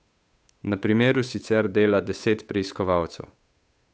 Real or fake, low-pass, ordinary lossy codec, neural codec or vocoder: fake; none; none; codec, 16 kHz, 8 kbps, FunCodec, trained on Chinese and English, 25 frames a second